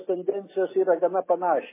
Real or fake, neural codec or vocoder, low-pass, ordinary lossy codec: real; none; 3.6 kHz; MP3, 16 kbps